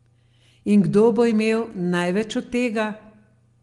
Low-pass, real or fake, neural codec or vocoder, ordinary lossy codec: 10.8 kHz; real; none; Opus, 24 kbps